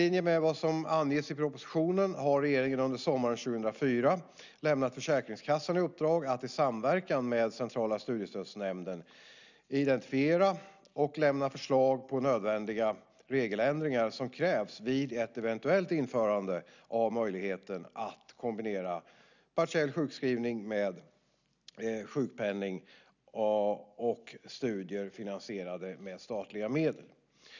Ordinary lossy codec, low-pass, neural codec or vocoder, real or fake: none; 7.2 kHz; none; real